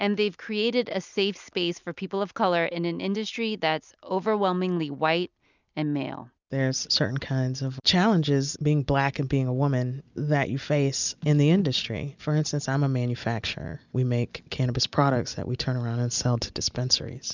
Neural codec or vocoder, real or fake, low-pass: none; real; 7.2 kHz